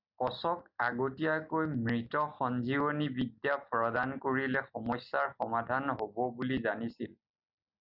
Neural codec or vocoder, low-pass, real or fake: none; 5.4 kHz; real